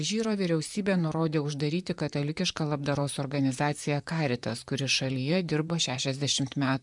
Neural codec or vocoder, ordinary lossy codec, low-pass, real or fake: vocoder, 44.1 kHz, 128 mel bands, Pupu-Vocoder; MP3, 96 kbps; 10.8 kHz; fake